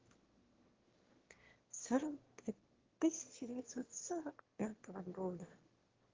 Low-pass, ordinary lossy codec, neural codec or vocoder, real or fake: 7.2 kHz; Opus, 16 kbps; autoencoder, 22.05 kHz, a latent of 192 numbers a frame, VITS, trained on one speaker; fake